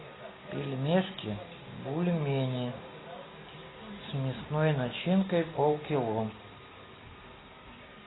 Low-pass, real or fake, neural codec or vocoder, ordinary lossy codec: 7.2 kHz; real; none; AAC, 16 kbps